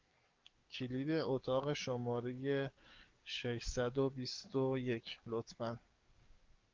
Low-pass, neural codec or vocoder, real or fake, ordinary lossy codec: 7.2 kHz; codec, 44.1 kHz, 7.8 kbps, DAC; fake; Opus, 24 kbps